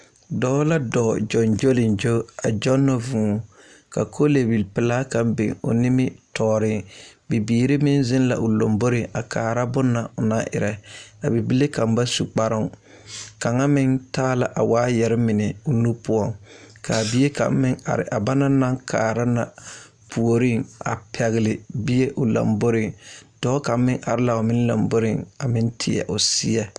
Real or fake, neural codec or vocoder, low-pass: real; none; 9.9 kHz